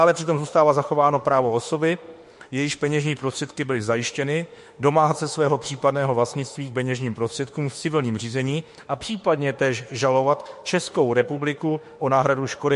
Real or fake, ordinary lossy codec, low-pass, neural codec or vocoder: fake; MP3, 48 kbps; 14.4 kHz; autoencoder, 48 kHz, 32 numbers a frame, DAC-VAE, trained on Japanese speech